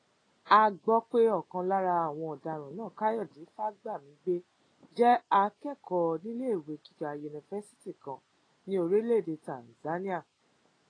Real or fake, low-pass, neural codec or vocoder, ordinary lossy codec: real; 9.9 kHz; none; AAC, 32 kbps